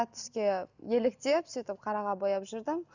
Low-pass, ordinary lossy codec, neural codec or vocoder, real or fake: 7.2 kHz; none; none; real